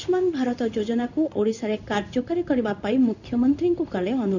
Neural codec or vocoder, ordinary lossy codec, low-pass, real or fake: codec, 16 kHz in and 24 kHz out, 1 kbps, XY-Tokenizer; none; 7.2 kHz; fake